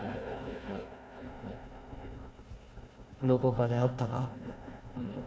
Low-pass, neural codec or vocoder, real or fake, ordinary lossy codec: none; codec, 16 kHz, 1 kbps, FunCodec, trained on Chinese and English, 50 frames a second; fake; none